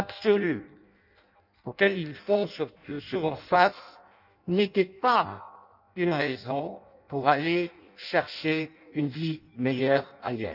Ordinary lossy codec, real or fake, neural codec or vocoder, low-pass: none; fake; codec, 16 kHz in and 24 kHz out, 0.6 kbps, FireRedTTS-2 codec; 5.4 kHz